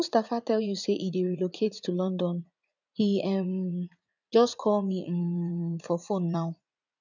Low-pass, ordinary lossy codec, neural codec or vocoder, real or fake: 7.2 kHz; none; vocoder, 44.1 kHz, 80 mel bands, Vocos; fake